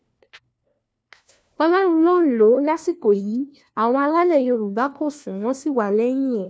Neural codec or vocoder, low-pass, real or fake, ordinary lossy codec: codec, 16 kHz, 1 kbps, FunCodec, trained on LibriTTS, 50 frames a second; none; fake; none